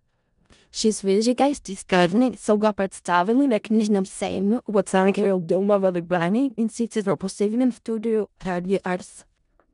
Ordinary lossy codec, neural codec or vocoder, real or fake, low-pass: none; codec, 16 kHz in and 24 kHz out, 0.4 kbps, LongCat-Audio-Codec, four codebook decoder; fake; 10.8 kHz